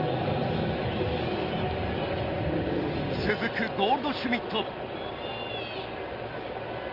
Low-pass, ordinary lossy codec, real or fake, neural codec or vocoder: 5.4 kHz; Opus, 24 kbps; real; none